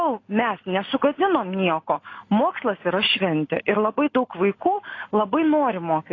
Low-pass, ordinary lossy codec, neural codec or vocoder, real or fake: 7.2 kHz; AAC, 32 kbps; none; real